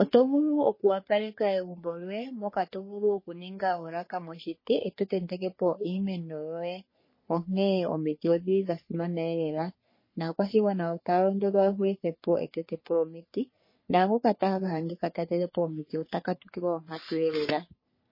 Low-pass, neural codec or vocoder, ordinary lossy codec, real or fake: 5.4 kHz; codec, 44.1 kHz, 3.4 kbps, Pupu-Codec; MP3, 24 kbps; fake